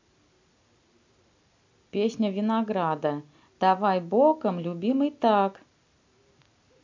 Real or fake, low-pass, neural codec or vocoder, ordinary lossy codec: real; 7.2 kHz; none; MP3, 48 kbps